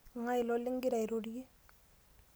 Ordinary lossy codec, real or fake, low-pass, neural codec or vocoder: none; real; none; none